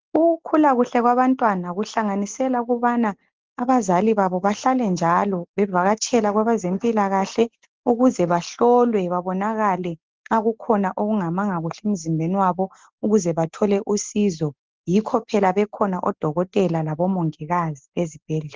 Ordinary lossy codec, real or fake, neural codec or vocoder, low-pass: Opus, 16 kbps; real; none; 7.2 kHz